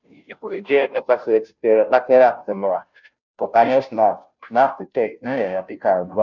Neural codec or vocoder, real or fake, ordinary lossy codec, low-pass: codec, 16 kHz, 0.5 kbps, FunCodec, trained on Chinese and English, 25 frames a second; fake; none; 7.2 kHz